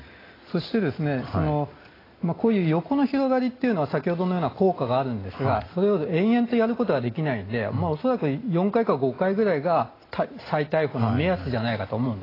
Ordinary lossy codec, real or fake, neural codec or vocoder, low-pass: AAC, 24 kbps; real; none; 5.4 kHz